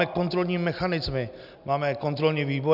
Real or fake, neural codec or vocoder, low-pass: real; none; 5.4 kHz